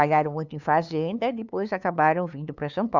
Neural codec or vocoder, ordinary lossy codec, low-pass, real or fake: codec, 16 kHz, 2 kbps, FunCodec, trained on LibriTTS, 25 frames a second; none; 7.2 kHz; fake